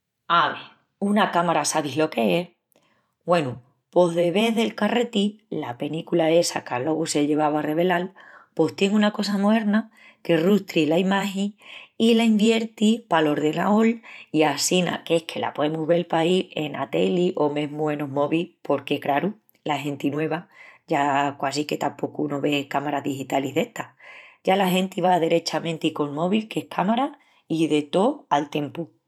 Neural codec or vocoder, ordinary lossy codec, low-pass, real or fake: vocoder, 44.1 kHz, 128 mel bands every 512 samples, BigVGAN v2; none; 19.8 kHz; fake